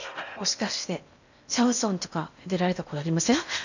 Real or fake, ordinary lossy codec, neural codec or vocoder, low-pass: fake; none; codec, 16 kHz in and 24 kHz out, 0.8 kbps, FocalCodec, streaming, 65536 codes; 7.2 kHz